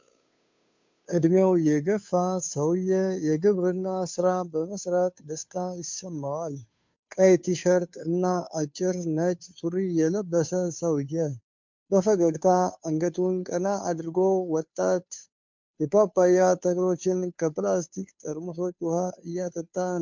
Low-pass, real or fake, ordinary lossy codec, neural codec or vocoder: 7.2 kHz; fake; MP3, 64 kbps; codec, 16 kHz, 2 kbps, FunCodec, trained on Chinese and English, 25 frames a second